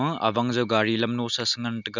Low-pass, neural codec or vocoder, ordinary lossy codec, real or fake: 7.2 kHz; none; none; real